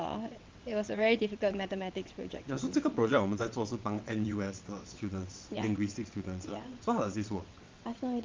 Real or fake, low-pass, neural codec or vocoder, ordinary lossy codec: fake; 7.2 kHz; vocoder, 22.05 kHz, 80 mel bands, WaveNeXt; Opus, 32 kbps